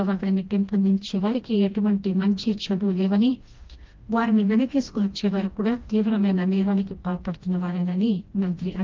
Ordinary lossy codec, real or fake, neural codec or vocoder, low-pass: Opus, 24 kbps; fake; codec, 16 kHz, 1 kbps, FreqCodec, smaller model; 7.2 kHz